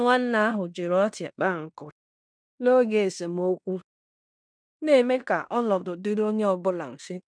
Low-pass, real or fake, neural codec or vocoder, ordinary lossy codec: 9.9 kHz; fake; codec, 16 kHz in and 24 kHz out, 0.9 kbps, LongCat-Audio-Codec, fine tuned four codebook decoder; none